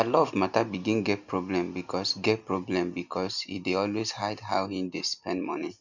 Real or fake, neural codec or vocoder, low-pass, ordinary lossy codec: real; none; 7.2 kHz; none